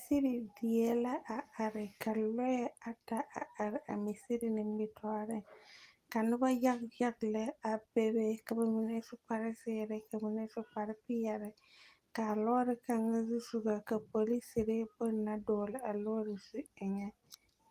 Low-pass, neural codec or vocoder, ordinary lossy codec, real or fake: 14.4 kHz; none; Opus, 24 kbps; real